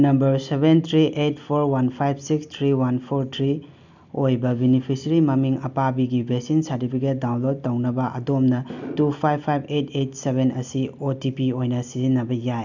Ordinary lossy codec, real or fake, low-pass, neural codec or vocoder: none; real; 7.2 kHz; none